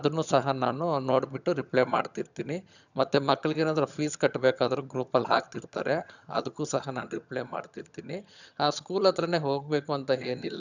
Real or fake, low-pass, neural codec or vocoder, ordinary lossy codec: fake; 7.2 kHz; vocoder, 22.05 kHz, 80 mel bands, HiFi-GAN; none